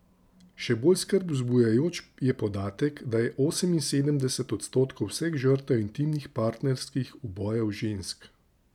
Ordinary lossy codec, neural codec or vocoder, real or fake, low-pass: none; none; real; 19.8 kHz